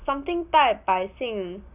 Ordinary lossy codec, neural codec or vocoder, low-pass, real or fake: none; none; 3.6 kHz; real